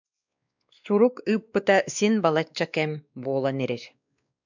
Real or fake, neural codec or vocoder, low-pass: fake; codec, 16 kHz, 2 kbps, X-Codec, WavLM features, trained on Multilingual LibriSpeech; 7.2 kHz